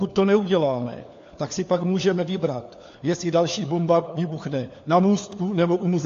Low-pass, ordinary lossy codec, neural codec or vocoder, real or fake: 7.2 kHz; AAC, 48 kbps; codec, 16 kHz, 4 kbps, FunCodec, trained on LibriTTS, 50 frames a second; fake